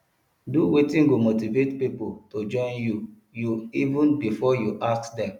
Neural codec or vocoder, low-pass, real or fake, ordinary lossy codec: none; 19.8 kHz; real; none